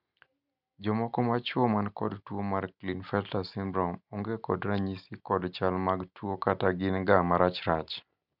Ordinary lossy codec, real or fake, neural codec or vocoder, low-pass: none; real; none; 5.4 kHz